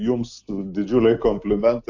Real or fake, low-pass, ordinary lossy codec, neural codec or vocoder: real; 7.2 kHz; AAC, 48 kbps; none